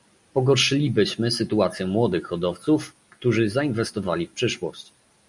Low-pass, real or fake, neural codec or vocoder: 10.8 kHz; real; none